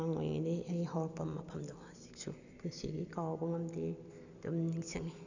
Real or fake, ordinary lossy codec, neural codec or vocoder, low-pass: real; none; none; 7.2 kHz